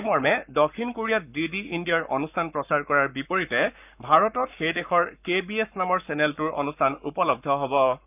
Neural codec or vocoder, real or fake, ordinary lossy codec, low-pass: codec, 44.1 kHz, 7.8 kbps, Pupu-Codec; fake; none; 3.6 kHz